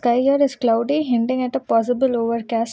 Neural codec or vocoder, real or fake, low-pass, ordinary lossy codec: none; real; none; none